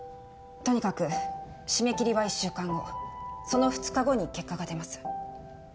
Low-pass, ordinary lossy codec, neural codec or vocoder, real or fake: none; none; none; real